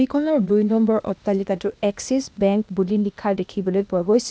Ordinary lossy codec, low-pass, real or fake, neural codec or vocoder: none; none; fake; codec, 16 kHz, 0.8 kbps, ZipCodec